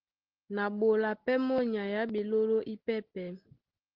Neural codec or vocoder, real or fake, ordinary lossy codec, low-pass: none; real; Opus, 16 kbps; 5.4 kHz